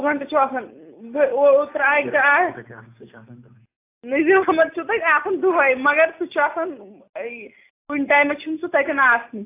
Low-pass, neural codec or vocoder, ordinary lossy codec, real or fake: 3.6 kHz; none; AAC, 24 kbps; real